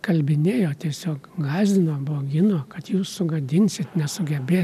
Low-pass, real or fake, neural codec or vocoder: 14.4 kHz; real; none